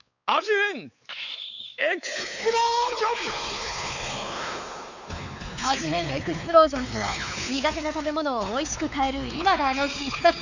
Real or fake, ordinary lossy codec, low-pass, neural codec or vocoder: fake; none; 7.2 kHz; codec, 16 kHz, 4 kbps, X-Codec, WavLM features, trained on Multilingual LibriSpeech